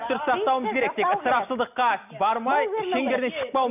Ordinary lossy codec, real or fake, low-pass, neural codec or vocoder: none; real; 3.6 kHz; none